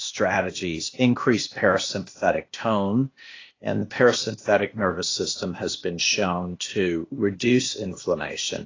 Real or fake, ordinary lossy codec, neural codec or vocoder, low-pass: fake; AAC, 32 kbps; codec, 16 kHz, 0.8 kbps, ZipCodec; 7.2 kHz